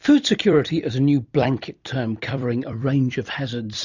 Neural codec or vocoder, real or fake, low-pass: vocoder, 44.1 kHz, 128 mel bands every 256 samples, BigVGAN v2; fake; 7.2 kHz